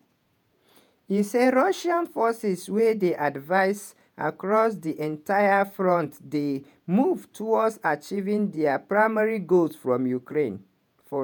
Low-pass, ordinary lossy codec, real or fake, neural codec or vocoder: none; none; fake; vocoder, 48 kHz, 128 mel bands, Vocos